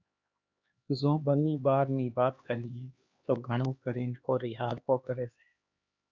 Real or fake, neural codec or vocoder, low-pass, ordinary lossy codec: fake; codec, 16 kHz, 2 kbps, X-Codec, HuBERT features, trained on LibriSpeech; 7.2 kHz; Opus, 64 kbps